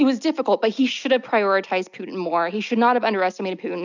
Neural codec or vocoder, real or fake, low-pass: none; real; 7.2 kHz